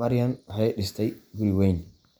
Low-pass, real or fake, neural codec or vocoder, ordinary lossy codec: none; real; none; none